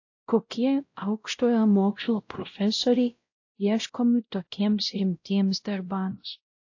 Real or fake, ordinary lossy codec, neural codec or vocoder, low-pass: fake; AAC, 48 kbps; codec, 16 kHz, 0.5 kbps, X-Codec, WavLM features, trained on Multilingual LibriSpeech; 7.2 kHz